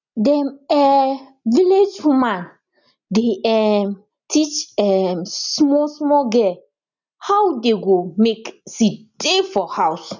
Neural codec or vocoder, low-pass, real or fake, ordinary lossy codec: none; 7.2 kHz; real; none